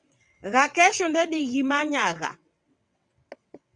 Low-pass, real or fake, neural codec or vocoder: 9.9 kHz; fake; vocoder, 22.05 kHz, 80 mel bands, WaveNeXt